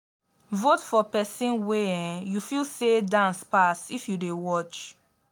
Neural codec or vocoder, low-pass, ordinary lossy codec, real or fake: none; none; none; real